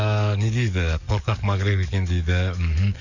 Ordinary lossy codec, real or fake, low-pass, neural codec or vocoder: none; fake; 7.2 kHz; codec, 44.1 kHz, 7.8 kbps, DAC